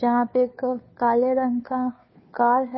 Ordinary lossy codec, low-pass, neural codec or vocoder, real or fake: MP3, 24 kbps; 7.2 kHz; codec, 16 kHz, 2 kbps, FunCodec, trained on Chinese and English, 25 frames a second; fake